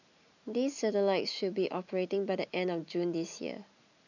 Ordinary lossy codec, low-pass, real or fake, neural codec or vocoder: none; 7.2 kHz; real; none